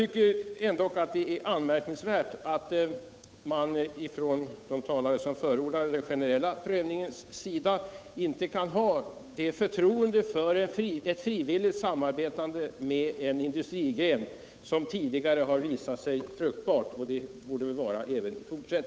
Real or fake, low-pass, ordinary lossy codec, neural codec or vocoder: fake; none; none; codec, 16 kHz, 8 kbps, FunCodec, trained on Chinese and English, 25 frames a second